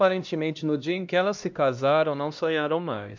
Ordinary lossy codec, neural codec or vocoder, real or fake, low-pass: MP3, 64 kbps; codec, 16 kHz, 1 kbps, X-Codec, HuBERT features, trained on LibriSpeech; fake; 7.2 kHz